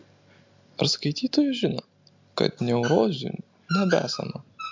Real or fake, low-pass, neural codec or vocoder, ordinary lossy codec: real; 7.2 kHz; none; MP3, 64 kbps